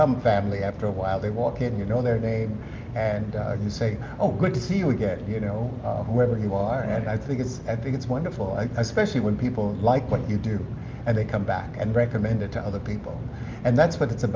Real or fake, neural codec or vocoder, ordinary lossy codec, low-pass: real; none; Opus, 24 kbps; 7.2 kHz